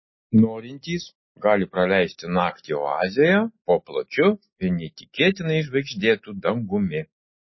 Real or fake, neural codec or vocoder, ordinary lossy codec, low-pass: real; none; MP3, 24 kbps; 7.2 kHz